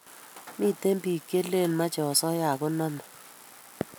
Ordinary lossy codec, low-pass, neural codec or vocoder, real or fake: none; none; none; real